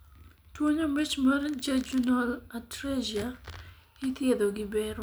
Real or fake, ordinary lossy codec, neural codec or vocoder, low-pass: real; none; none; none